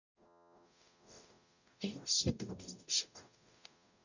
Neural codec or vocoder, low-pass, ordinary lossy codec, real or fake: codec, 44.1 kHz, 0.9 kbps, DAC; 7.2 kHz; none; fake